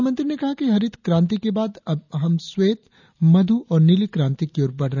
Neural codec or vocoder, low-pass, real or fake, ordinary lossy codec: none; 7.2 kHz; real; none